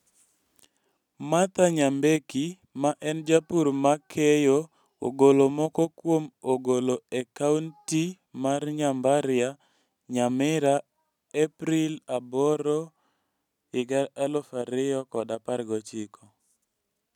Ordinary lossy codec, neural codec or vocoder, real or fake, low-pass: none; none; real; 19.8 kHz